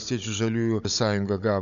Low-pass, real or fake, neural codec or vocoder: 7.2 kHz; fake; codec, 16 kHz, 16 kbps, FunCodec, trained on Chinese and English, 50 frames a second